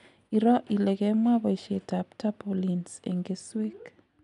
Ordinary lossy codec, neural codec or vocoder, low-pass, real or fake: none; none; 10.8 kHz; real